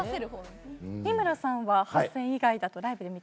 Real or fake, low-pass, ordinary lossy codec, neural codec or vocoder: real; none; none; none